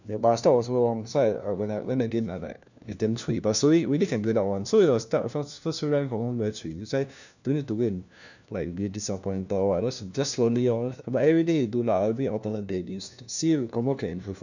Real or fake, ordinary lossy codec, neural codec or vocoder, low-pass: fake; none; codec, 16 kHz, 1 kbps, FunCodec, trained on LibriTTS, 50 frames a second; 7.2 kHz